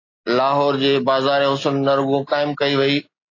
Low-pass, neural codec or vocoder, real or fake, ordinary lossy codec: 7.2 kHz; none; real; AAC, 32 kbps